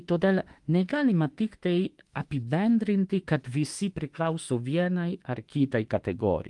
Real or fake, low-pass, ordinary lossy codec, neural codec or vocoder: fake; 10.8 kHz; Opus, 24 kbps; autoencoder, 48 kHz, 32 numbers a frame, DAC-VAE, trained on Japanese speech